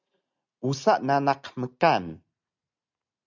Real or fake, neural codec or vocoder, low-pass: real; none; 7.2 kHz